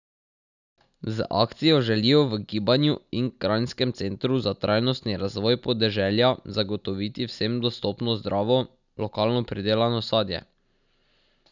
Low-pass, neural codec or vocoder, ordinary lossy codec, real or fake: 7.2 kHz; none; none; real